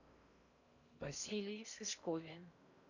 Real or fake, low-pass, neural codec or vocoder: fake; 7.2 kHz; codec, 16 kHz in and 24 kHz out, 0.6 kbps, FocalCodec, streaming, 4096 codes